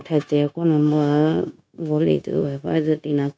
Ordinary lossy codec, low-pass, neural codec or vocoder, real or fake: none; none; codec, 16 kHz, 0.9 kbps, LongCat-Audio-Codec; fake